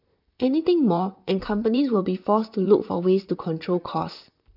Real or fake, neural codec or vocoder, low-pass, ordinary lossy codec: fake; vocoder, 44.1 kHz, 128 mel bands, Pupu-Vocoder; 5.4 kHz; none